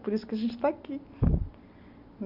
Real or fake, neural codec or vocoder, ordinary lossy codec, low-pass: real; none; none; 5.4 kHz